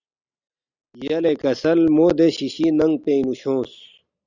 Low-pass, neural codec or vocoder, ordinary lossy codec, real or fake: 7.2 kHz; none; Opus, 64 kbps; real